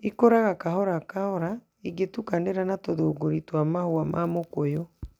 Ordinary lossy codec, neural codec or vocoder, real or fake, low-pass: none; none; real; 19.8 kHz